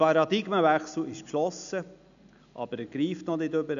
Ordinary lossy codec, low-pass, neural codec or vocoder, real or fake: none; 7.2 kHz; none; real